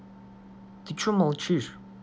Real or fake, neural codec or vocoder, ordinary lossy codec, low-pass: real; none; none; none